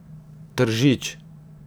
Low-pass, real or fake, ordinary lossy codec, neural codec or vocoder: none; fake; none; vocoder, 44.1 kHz, 128 mel bands every 512 samples, BigVGAN v2